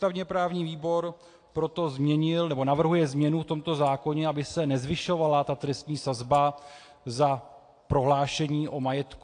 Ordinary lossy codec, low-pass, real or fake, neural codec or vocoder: AAC, 48 kbps; 9.9 kHz; real; none